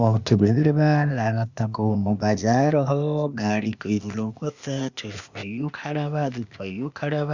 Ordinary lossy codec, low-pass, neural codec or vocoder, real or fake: Opus, 64 kbps; 7.2 kHz; codec, 16 kHz, 0.8 kbps, ZipCodec; fake